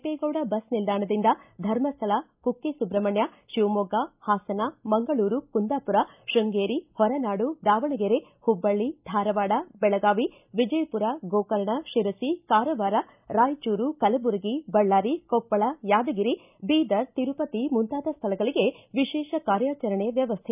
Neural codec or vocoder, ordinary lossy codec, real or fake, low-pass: none; none; real; 3.6 kHz